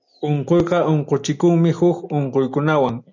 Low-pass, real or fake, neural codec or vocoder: 7.2 kHz; real; none